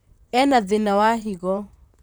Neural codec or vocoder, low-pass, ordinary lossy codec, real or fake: vocoder, 44.1 kHz, 128 mel bands, Pupu-Vocoder; none; none; fake